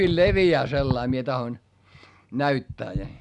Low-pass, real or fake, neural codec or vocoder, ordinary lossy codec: 10.8 kHz; real; none; none